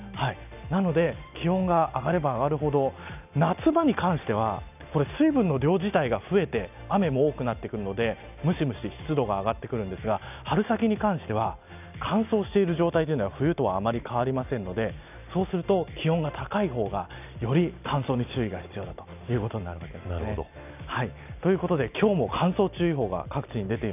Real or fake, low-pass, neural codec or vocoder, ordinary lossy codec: real; 3.6 kHz; none; none